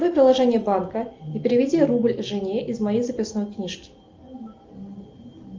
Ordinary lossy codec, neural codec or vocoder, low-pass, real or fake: Opus, 24 kbps; none; 7.2 kHz; real